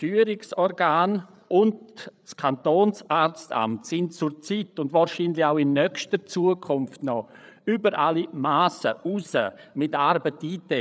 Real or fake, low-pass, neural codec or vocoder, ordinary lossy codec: fake; none; codec, 16 kHz, 8 kbps, FreqCodec, larger model; none